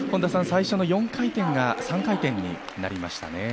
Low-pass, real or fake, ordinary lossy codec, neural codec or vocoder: none; real; none; none